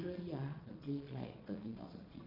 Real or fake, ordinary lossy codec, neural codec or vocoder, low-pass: fake; none; vocoder, 22.05 kHz, 80 mel bands, Vocos; 5.4 kHz